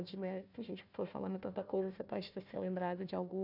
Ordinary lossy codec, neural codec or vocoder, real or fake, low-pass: none; codec, 16 kHz, 1 kbps, FunCodec, trained on Chinese and English, 50 frames a second; fake; 5.4 kHz